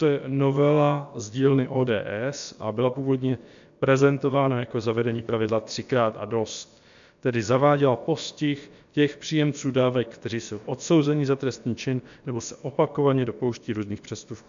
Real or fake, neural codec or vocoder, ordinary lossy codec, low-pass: fake; codec, 16 kHz, about 1 kbps, DyCAST, with the encoder's durations; MP3, 64 kbps; 7.2 kHz